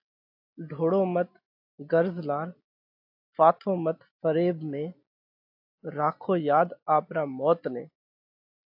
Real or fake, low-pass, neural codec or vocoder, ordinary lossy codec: real; 5.4 kHz; none; AAC, 48 kbps